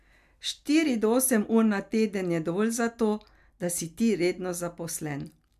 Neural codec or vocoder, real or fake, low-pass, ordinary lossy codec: none; real; 14.4 kHz; MP3, 96 kbps